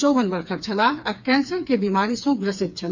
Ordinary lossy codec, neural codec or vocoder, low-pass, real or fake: none; codec, 16 kHz, 4 kbps, FreqCodec, smaller model; 7.2 kHz; fake